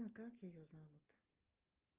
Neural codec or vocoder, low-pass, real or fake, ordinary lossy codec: none; 3.6 kHz; real; Opus, 32 kbps